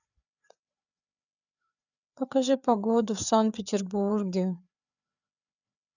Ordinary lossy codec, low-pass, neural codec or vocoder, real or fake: none; 7.2 kHz; codec, 16 kHz, 4 kbps, FreqCodec, larger model; fake